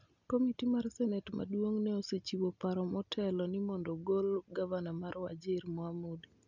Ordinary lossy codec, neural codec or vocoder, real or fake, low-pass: none; none; real; 7.2 kHz